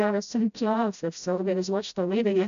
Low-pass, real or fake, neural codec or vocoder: 7.2 kHz; fake; codec, 16 kHz, 0.5 kbps, FreqCodec, smaller model